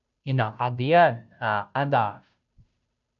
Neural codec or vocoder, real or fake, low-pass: codec, 16 kHz, 0.5 kbps, FunCodec, trained on Chinese and English, 25 frames a second; fake; 7.2 kHz